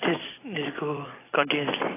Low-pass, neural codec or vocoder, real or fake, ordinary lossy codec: 3.6 kHz; vocoder, 44.1 kHz, 128 mel bands every 256 samples, BigVGAN v2; fake; AAC, 16 kbps